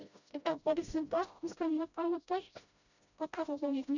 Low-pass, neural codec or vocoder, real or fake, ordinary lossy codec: 7.2 kHz; codec, 16 kHz, 0.5 kbps, FreqCodec, smaller model; fake; none